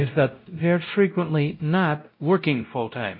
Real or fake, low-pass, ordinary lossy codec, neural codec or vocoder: fake; 5.4 kHz; MP3, 32 kbps; codec, 16 kHz, 0.5 kbps, X-Codec, WavLM features, trained on Multilingual LibriSpeech